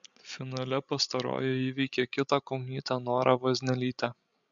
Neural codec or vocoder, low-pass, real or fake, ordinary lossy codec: codec, 16 kHz, 16 kbps, FreqCodec, larger model; 7.2 kHz; fake; MP3, 64 kbps